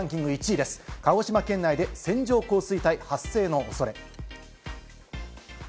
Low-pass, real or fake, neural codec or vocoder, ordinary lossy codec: none; real; none; none